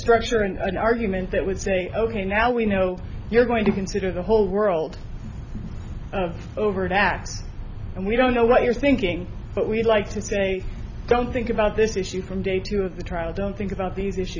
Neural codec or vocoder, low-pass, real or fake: none; 7.2 kHz; real